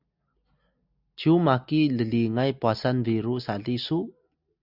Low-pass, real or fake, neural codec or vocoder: 5.4 kHz; real; none